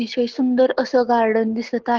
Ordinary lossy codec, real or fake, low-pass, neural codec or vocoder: Opus, 16 kbps; real; 7.2 kHz; none